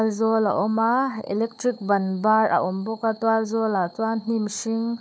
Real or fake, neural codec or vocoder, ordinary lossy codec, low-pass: fake; codec, 16 kHz, 4 kbps, FunCodec, trained on Chinese and English, 50 frames a second; none; none